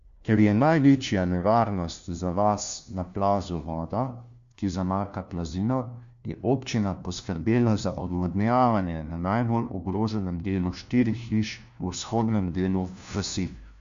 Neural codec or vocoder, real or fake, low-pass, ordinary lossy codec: codec, 16 kHz, 1 kbps, FunCodec, trained on LibriTTS, 50 frames a second; fake; 7.2 kHz; Opus, 64 kbps